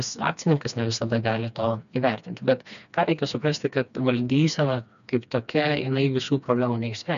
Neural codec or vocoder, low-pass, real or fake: codec, 16 kHz, 2 kbps, FreqCodec, smaller model; 7.2 kHz; fake